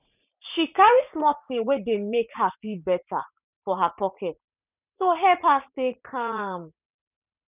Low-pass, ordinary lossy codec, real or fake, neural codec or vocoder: 3.6 kHz; none; fake; vocoder, 44.1 kHz, 80 mel bands, Vocos